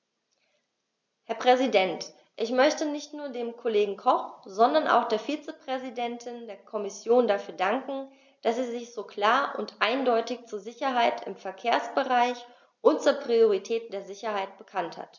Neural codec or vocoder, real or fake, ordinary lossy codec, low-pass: none; real; none; 7.2 kHz